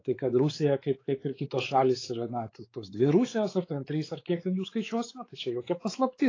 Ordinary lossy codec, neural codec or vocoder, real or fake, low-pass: AAC, 32 kbps; codec, 16 kHz, 4 kbps, X-Codec, WavLM features, trained on Multilingual LibriSpeech; fake; 7.2 kHz